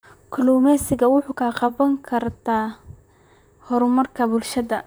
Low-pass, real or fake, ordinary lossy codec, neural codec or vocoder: none; fake; none; vocoder, 44.1 kHz, 128 mel bands, Pupu-Vocoder